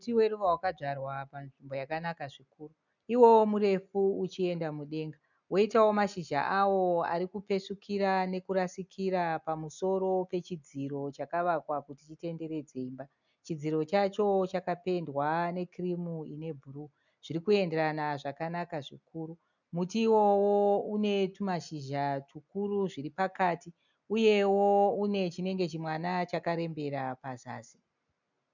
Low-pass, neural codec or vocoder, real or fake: 7.2 kHz; none; real